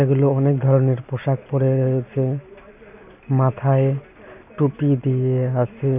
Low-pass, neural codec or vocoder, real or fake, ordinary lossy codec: 3.6 kHz; none; real; none